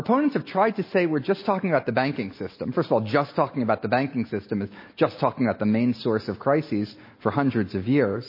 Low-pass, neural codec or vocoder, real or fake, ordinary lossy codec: 5.4 kHz; none; real; MP3, 24 kbps